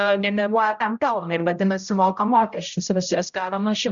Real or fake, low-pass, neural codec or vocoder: fake; 7.2 kHz; codec, 16 kHz, 0.5 kbps, X-Codec, HuBERT features, trained on general audio